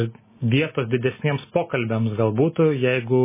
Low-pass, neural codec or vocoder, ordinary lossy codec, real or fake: 3.6 kHz; none; MP3, 16 kbps; real